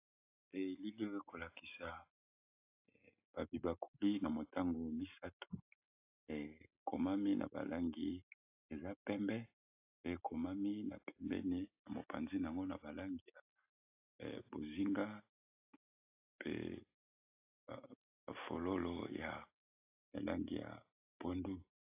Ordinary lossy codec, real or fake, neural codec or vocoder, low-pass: AAC, 32 kbps; real; none; 3.6 kHz